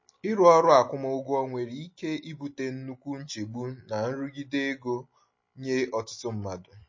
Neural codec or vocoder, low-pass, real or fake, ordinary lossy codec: none; 7.2 kHz; real; MP3, 32 kbps